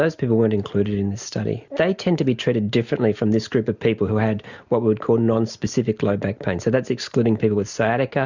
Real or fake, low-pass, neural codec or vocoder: real; 7.2 kHz; none